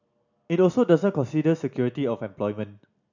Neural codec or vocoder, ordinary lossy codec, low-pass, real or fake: none; none; 7.2 kHz; real